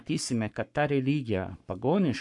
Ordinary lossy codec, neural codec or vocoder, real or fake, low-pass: MP3, 96 kbps; codec, 44.1 kHz, 7.8 kbps, Pupu-Codec; fake; 10.8 kHz